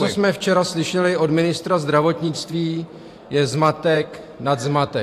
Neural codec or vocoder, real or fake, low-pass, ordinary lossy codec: vocoder, 44.1 kHz, 128 mel bands every 512 samples, BigVGAN v2; fake; 14.4 kHz; AAC, 64 kbps